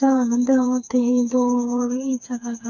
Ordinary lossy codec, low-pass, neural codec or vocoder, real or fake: none; 7.2 kHz; codec, 16 kHz, 4 kbps, FreqCodec, smaller model; fake